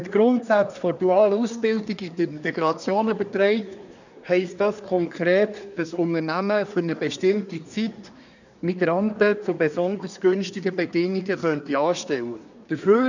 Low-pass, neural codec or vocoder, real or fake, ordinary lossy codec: 7.2 kHz; codec, 24 kHz, 1 kbps, SNAC; fake; none